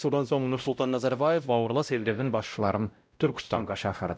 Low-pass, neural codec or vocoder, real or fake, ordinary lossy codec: none; codec, 16 kHz, 0.5 kbps, X-Codec, WavLM features, trained on Multilingual LibriSpeech; fake; none